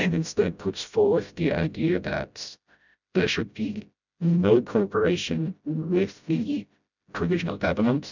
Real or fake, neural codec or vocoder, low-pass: fake; codec, 16 kHz, 0.5 kbps, FreqCodec, smaller model; 7.2 kHz